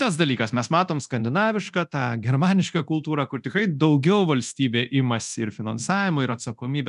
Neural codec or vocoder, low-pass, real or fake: codec, 24 kHz, 0.9 kbps, DualCodec; 10.8 kHz; fake